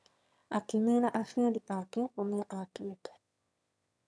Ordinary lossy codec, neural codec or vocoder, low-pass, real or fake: none; autoencoder, 22.05 kHz, a latent of 192 numbers a frame, VITS, trained on one speaker; none; fake